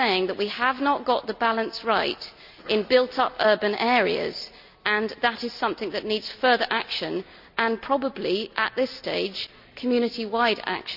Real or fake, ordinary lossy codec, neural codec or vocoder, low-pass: real; none; none; 5.4 kHz